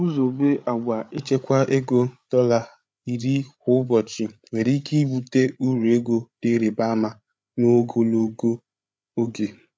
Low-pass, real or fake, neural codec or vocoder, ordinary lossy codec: none; fake; codec, 16 kHz, 8 kbps, FreqCodec, larger model; none